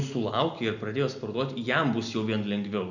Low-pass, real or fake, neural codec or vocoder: 7.2 kHz; real; none